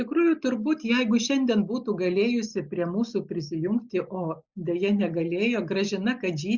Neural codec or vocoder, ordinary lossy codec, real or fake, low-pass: none; Opus, 64 kbps; real; 7.2 kHz